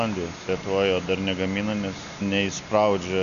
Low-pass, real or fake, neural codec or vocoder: 7.2 kHz; real; none